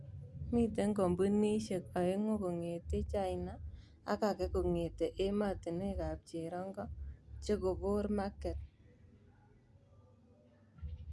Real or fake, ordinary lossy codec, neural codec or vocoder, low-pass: real; none; none; none